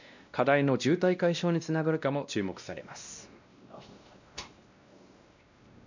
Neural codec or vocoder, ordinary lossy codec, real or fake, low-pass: codec, 16 kHz, 1 kbps, X-Codec, WavLM features, trained on Multilingual LibriSpeech; none; fake; 7.2 kHz